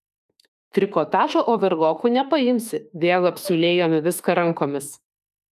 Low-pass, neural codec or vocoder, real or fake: 14.4 kHz; autoencoder, 48 kHz, 32 numbers a frame, DAC-VAE, trained on Japanese speech; fake